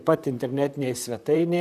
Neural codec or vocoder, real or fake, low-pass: vocoder, 44.1 kHz, 128 mel bands, Pupu-Vocoder; fake; 14.4 kHz